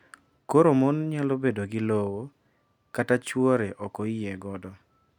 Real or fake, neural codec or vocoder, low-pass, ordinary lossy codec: real; none; 19.8 kHz; none